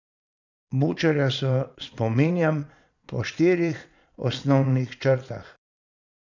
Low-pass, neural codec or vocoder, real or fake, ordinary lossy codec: 7.2 kHz; vocoder, 22.05 kHz, 80 mel bands, Vocos; fake; none